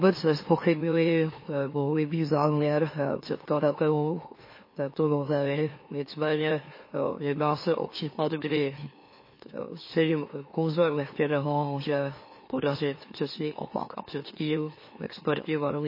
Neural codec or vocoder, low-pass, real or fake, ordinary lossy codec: autoencoder, 44.1 kHz, a latent of 192 numbers a frame, MeloTTS; 5.4 kHz; fake; MP3, 24 kbps